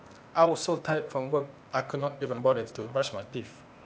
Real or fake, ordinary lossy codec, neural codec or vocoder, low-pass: fake; none; codec, 16 kHz, 0.8 kbps, ZipCodec; none